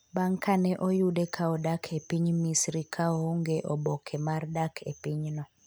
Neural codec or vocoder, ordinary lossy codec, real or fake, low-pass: none; none; real; none